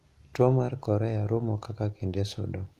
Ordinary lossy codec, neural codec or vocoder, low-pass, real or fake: Opus, 32 kbps; none; 14.4 kHz; real